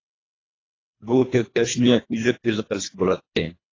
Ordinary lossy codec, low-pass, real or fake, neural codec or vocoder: AAC, 32 kbps; 7.2 kHz; fake; codec, 24 kHz, 1.5 kbps, HILCodec